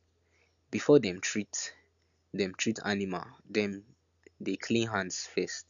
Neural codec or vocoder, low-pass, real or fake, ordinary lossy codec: none; 7.2 kHz; real; none